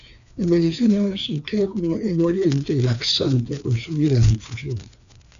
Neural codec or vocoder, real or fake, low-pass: codec, 16 kHz, 2 kbps, FreqCodec, larger model; fake; 7.2 kHz